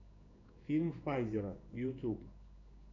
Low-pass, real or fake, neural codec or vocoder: 7.2 kHz; fake; codec, 16 kHz in and 24 kHz out, 1 kbps, XY-Tokenizer